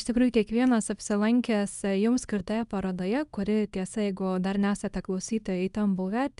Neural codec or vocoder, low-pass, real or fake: codec, 24 kHz, 0.9 kbps, WavTokenizer, small release; 10.8 kHz; fake